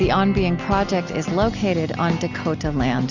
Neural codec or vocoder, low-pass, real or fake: none; 7.2 kHz; real